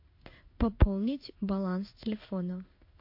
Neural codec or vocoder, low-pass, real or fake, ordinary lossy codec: codec, 16 kHz in and 24 kHz out, 1 kbps, XY-Tokenizer; 5.4 kHz; fake; MP3, 32 kbps